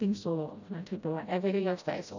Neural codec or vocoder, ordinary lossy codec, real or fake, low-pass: codec, 16 kHz, 0.5 kbps, FreqCodec, smaller model; none; fake; 7.2 kHz